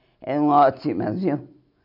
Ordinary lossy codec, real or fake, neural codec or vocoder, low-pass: none; real; none; 5.4 kHz